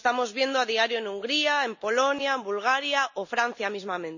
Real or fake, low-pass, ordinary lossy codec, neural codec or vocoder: real; 7.2 kHz; none; none